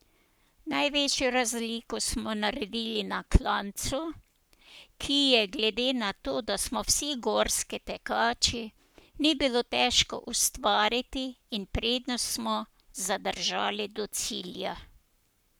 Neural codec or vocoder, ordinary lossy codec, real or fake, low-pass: codec, 44.1 kHz, 7.8 kbps, Pupu-Codec; none; fake; none